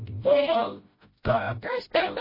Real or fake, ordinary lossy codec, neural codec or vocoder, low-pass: fake; MP3, 24 kbps; codec, 16 kHz, 0.5 kbps, FreqCodec, smaller model; 5.4 kHz